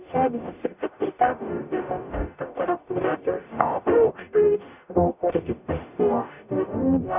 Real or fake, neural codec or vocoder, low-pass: fake; codec, 44.1 kHz, 0.9 kbps, DAC; 3.6 kHz